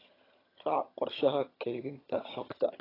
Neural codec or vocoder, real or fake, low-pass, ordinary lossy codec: vocoder, 22.05 kHz, 80 mel bands, HiFi-GAN; fake; 5.4 kHz; AAC, 24 kbps